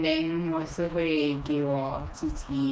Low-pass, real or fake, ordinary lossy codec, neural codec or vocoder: none; fake; none; codec, 16 kHz, 2 kbps, FreqCodec, smaller model